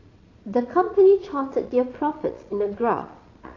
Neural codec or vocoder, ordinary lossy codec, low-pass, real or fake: vocoder, 22.05 kHz, 80 mel bands, Vocos; AAC, 32 kbps; 7.2 kHz; fake